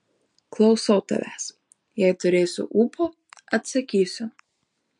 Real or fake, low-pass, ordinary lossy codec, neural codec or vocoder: real; 9.9 kHz; MP3, 64 kbps; none